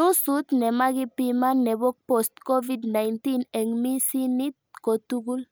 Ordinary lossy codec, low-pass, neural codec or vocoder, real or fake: none; none; none; real